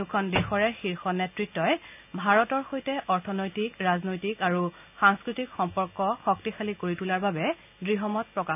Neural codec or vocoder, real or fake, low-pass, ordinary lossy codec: none; real; 3.6 kHz; none